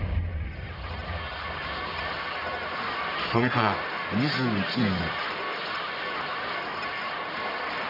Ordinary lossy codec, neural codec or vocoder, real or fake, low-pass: none; codec, 44.1 kHz, 1.7 kbps, Pupu-Codec; fake; 5.4 kHz